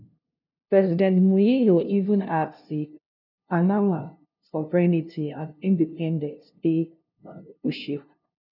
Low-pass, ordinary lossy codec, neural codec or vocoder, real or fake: 5.4 kHz; none; codec, 16 kHz, 0.5 kbps, FunCodec, trained on LibriTTS, 25 frames a second; fake